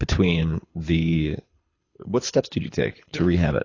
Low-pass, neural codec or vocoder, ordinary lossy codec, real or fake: 7.2 kHz; codec, 24 kHz, 6 kbps, HILCodec; AAC, 32 kbps; fake